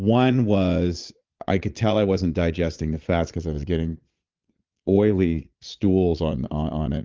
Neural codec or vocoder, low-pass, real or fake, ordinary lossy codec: vocoder, 22.05 kHz, 80 mel bands, Vocos; 7.2 kHz; fake; Opus, 24 kbps